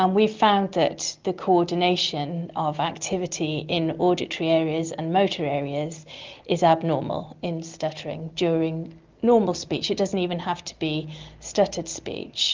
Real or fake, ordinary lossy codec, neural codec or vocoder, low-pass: real; Opus, 24 kbps; none; 7.2 kHz